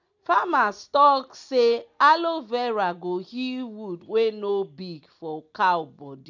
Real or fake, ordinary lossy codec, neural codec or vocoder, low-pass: real; none; none; 7.2 kHz